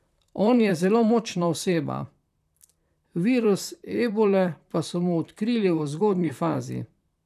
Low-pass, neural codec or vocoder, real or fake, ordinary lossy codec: 14.4 kHz; vocoder, 44.1 kHz, 128 mel bands, Pupu-Vocoder; fake; none